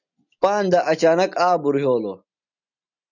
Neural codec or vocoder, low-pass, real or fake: none; 7.2 kHz; real